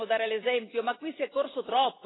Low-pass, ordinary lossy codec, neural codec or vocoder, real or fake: 7.2 kHz; AAC, 16 kbps; none; real